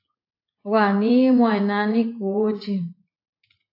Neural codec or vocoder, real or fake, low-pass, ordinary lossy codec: vocoder, 44.1 kHz, 80 mel bands, Vocos; fake; 5.4 kHz; AAC, 32 kbps